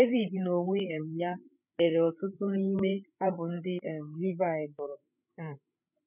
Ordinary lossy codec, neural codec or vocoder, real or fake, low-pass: none; codec, 16 kHz, 8 kbps, FreqCodec, larger model; fake; 3.6 kHz